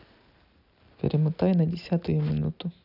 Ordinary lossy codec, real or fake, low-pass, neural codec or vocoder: none; real; 5.4 kHz; none